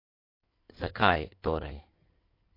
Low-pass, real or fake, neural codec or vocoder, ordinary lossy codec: 5.4 kHz; fake; codec, 16 kHz in and 24 kHz out, 2.2 kbps, FireRedTTS-2 codec; MP3, 32 kbps